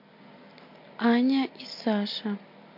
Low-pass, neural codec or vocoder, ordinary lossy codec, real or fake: 5.4 kHz; none; MP3, 32 kbps; real